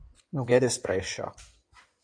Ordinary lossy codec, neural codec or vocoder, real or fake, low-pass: MP3, 96 kbps; codec, 16 kHz in and 24 kHz out, 2.2 kbps, FireRedTTS-2 codec; fake; 9.9 kHz